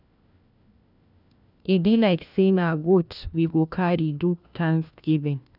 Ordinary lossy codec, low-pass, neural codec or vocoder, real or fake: none; 5.4 kHz; codec, 16 kHz, 1 kbps, FunCodec, trained on LibriTTS, 50 frames a second; fake